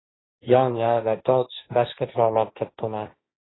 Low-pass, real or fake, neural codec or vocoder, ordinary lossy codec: 7.2 kHz; fake; codec, 16 kHz, 1.1 kbps, Voila-Tokenizer; AAC, 16 kbps